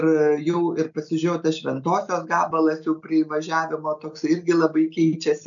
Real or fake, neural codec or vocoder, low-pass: real; none; 7.2 kHz